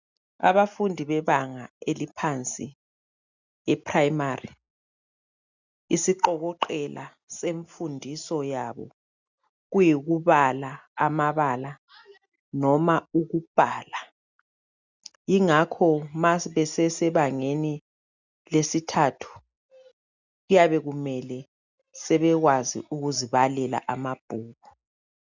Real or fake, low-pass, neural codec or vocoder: real; 7.2 kHz; none